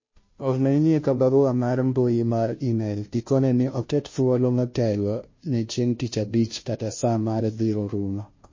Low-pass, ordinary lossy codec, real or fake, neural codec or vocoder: 7.2 kHz; MP3, 32 kbps; fake; codec, 16 kHz, 0.5 kbps, FunCodec, trained on Chinese and English, 25 frames a second